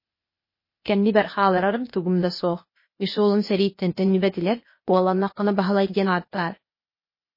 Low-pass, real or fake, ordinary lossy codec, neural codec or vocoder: 5.4 kHz; fake; MP3, 24 kbps; codec, 16 kHz, 0.8 kbps, ZipCodec